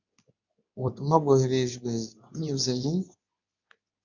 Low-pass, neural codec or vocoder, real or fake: 7.2 kHz; codec, 24 kHz, 0.9 kbps, WavTokenizer, medium speech release version 2; fake